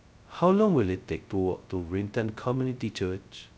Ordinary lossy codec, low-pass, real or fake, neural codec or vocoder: none; none; fake; codec, 16 kHz, 0.2 kbps, FocalCodec